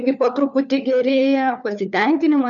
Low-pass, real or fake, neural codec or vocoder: 7.2 kHz; fake; codec, 16 kHz, 4 kbps, FunCodec, trained on LibriTTS, 50 frames a second